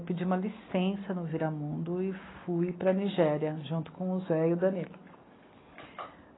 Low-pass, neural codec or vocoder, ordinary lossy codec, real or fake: 7.2 kHz; none; AAC, 16 kbps; real